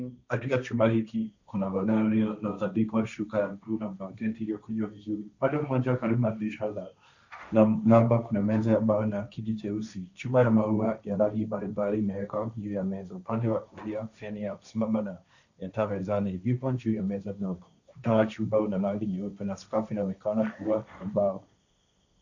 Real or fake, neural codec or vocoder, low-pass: fake; codec, 16 kHz, 1.1 kbps, Voila-Tokenizer; 7.2 kHz